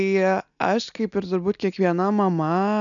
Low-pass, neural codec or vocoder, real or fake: 7.2 kHz; none; real